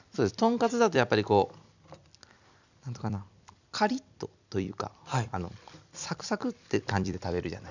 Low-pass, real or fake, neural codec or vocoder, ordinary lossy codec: 7.2 kHz; fake; vocoder, 44.1 kHz, 128 mel bands every 256 samples, BigVGAN v2; none